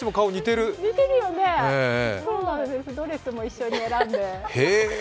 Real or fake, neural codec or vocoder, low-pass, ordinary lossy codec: real; none; none; none